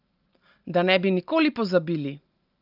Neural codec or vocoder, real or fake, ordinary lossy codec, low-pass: none; real; Opus, 32 kbps; 5.4 kHz